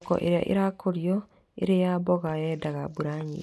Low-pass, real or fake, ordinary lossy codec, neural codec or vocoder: none; real; none; none